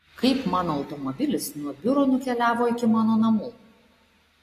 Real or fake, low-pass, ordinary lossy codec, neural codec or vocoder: real; 14.4 kHz; AAC, 48 kbps; none